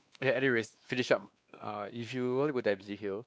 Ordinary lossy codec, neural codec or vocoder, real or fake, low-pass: none; codec, 16 kHz, 2 kbps, X-Codec, WavLM features, trained on Multilingual LibriSpeech; fake; none